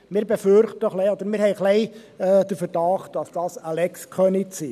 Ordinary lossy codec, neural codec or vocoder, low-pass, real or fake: none; none; 14.4 kHz; real